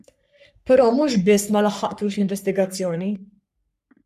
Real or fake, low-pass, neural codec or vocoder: fake; 14.4 kHz; codec, 44.1 kHz, 3.4 kbps, Pupu-Codec